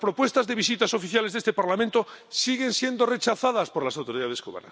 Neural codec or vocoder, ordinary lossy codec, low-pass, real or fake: none; none; none; real